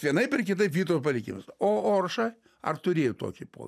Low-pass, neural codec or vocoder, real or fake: 14.4 kHz; none; real